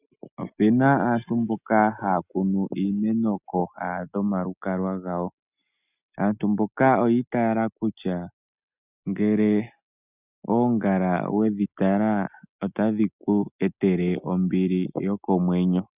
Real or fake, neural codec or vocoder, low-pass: real; none; 3.6 kHz